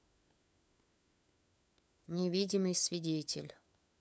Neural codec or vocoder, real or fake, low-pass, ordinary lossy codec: codec, 16 kHz, 4 kbps, FunCodec, trained on LibriTTS, 50 frames a second; fake; none; none